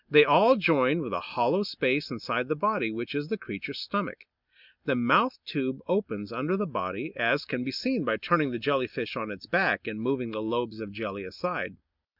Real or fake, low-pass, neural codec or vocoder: real; 5.4 kHz; none